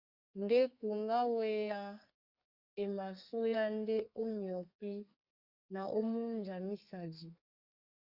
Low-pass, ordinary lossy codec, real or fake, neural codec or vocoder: 5.4 kHz; Opus, 64 kbps; fake; codec, 32 kHz, 1.9 kbps, SNAC